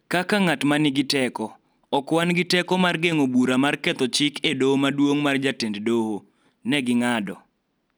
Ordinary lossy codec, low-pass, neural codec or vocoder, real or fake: none; none; none; real